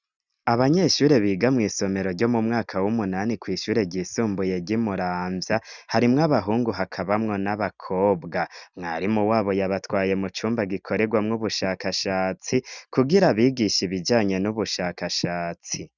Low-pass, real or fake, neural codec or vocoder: 7.2 kHz; real; none